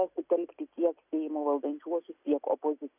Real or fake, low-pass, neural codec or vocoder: real; 3.6 kHz; none